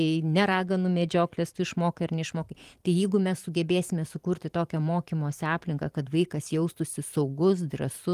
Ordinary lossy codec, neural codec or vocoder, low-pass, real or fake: Opus, 24 kbps; vocoder, 44.1 kHz, 128 mel bands every 512 samples, BigVGAN v2; 14.4 kHz; fake